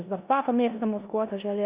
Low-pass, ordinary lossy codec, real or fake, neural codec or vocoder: 3.6 kHz; Opus, 64 kbps; fake; codec, 16 kHz in and 24 kHz out, 0.9 kbps, LongCat-Audio-Codec, four codebook decoder